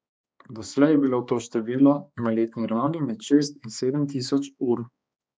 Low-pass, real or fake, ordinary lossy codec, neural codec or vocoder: none; fake; none; codec, 16 kHz, 2 kbps, X-Codec, HuBERT features, trained on balanced general audio